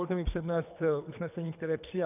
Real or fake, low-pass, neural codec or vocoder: fake; 3.6 kHz; codec, 24 kHz, 3 kbps, HILCodec